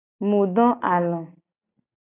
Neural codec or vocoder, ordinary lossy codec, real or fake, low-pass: none; AAC, 16 kbps; real; 3.6 kHz